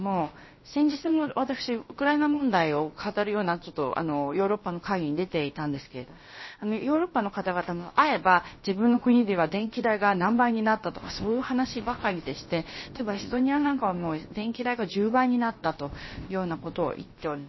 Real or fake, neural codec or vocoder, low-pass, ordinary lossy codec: fake; codec, 16 kHz, about 1 kbps, DyCAST, with the encoder's durations; 7.2 kHz; MP3, 24 kbps